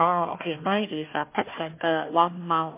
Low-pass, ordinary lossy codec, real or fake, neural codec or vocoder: 3.6 kHz; MP3, 24 kbps; fake; codec, 16 kHz, 1 kbps, FunCodec, trained on Chinese and English, 50 frames a second